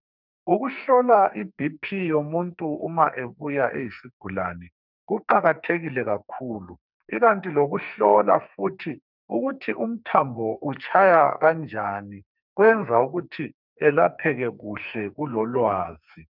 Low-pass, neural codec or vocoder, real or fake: 5.4 kHz; codec, 44.1 kHz, 2.6 kbps, SNAC; fake